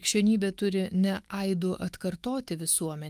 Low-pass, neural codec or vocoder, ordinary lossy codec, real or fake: 14.4 kHz; autoencoder, 48 kHz, 128 numbers a frame, DAC-VAE, trained on Japanese speech; Opus, 32 kbps; fake